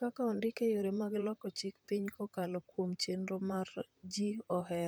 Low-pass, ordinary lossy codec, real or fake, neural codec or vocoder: none; none; fake; vocoder, 44.1 kHz, 128 mel bands, Pupu-Vocoder